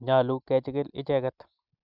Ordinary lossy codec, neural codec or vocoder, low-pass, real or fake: none; none; 5.4 kHz; real